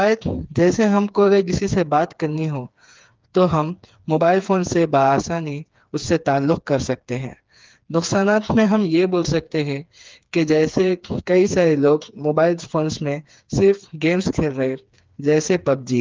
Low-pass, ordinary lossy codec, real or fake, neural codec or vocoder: 7.2 kHz; Opus, 32 kbps; fake; codec, 16 kHz, 4 kbps, FreqCodec, smaller model